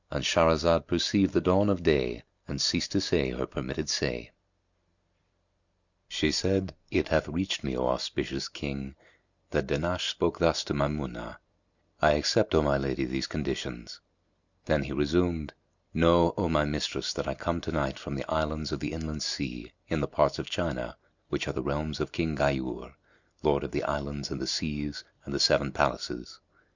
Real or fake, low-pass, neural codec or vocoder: real; 7.2 kHz; none